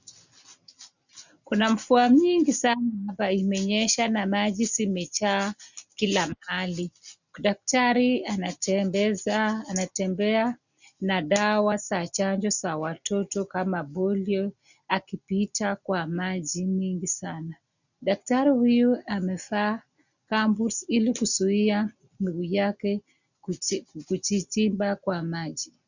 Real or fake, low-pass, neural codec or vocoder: real; 7.2 kHz; none